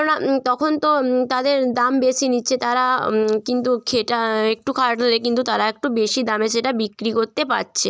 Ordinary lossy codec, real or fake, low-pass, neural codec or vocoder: none; real; none; none